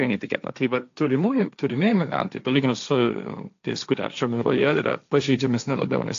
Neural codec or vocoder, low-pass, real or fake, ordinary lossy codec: codec, 16 kHz, 1.1 kbps, Voila-Tokenizer; 7.2 kHz; fake; AAC, 64 kbps